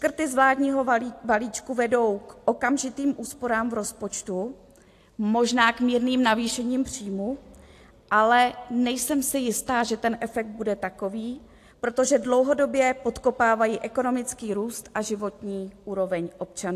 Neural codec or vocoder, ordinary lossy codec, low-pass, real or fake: none; AAC, 64 kbps; 14.4 kHz; real